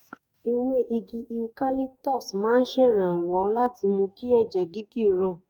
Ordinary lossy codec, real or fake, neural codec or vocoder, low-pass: none; fake; codec, 44.1 kHz, 2.6 kbps, DAC; 19.8 kHz